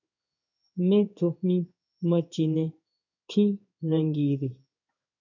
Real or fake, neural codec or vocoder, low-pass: fake; codec, 16 kHz in and 24 kHz out, 1 kbps, XY-Tokenizer; 7.2 kHz